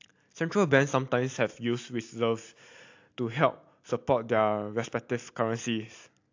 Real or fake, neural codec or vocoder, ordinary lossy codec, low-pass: real; none; AAC, 48 kbps; 7.2 kHz